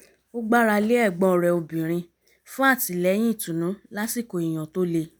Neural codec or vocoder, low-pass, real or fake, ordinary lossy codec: none; none; real; none